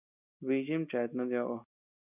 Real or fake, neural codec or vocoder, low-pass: real; none; 3.6 kHz